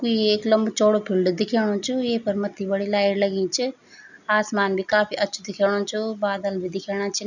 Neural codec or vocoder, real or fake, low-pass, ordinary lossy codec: none; real; 7.2 kHz; none